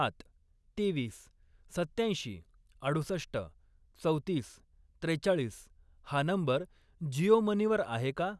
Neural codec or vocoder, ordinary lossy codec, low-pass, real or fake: none; none; none; real